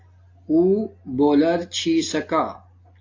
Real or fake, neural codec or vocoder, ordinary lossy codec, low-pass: real; none; AAC, 48 kbps; 7.2 kHz